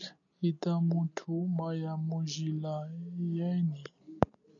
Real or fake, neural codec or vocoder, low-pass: real; none; 7.2 kHz